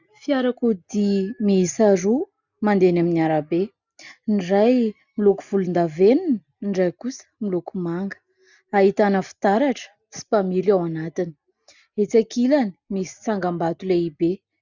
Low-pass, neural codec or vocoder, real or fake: 7.2 kHz; none; real